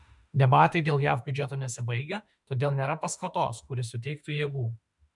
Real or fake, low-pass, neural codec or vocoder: fake; 10.8 kHz; autoencoder, 48 kHz, 32 numbers a frame, DAC-VAE, trained on Japanese speech